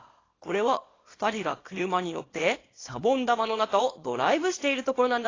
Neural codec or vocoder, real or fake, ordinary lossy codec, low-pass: codec, 24 kHz, 0.9 kbps, WavTokenizer, small release; fake; AAC, 32 kbps; 7.2 kHz